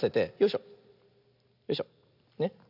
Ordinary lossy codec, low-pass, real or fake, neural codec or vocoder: none; 5.4 kHz; real; none